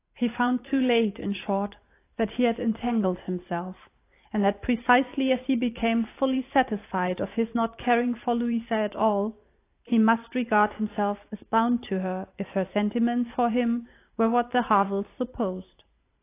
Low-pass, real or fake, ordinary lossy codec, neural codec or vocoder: 3.6 kHz; fake; AAC, 24 kbps; vocoder, 44.1 kHz, 128 mel bands every 512 samples, BigVGAN v2